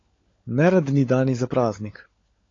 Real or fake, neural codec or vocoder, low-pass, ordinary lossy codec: fake; codec, 16 kHz, 16 kbps, FunCodec, trained on LibriTTS, 50 frames a second; 7.2 kHz; AAC, 32 kbps